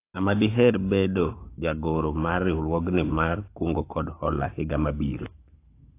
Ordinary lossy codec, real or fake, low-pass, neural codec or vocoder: AAC, 24 kbps; fake; 3.6 kHz; codec, 44.1 kHz, 7.8 kbps, Pupu-Codec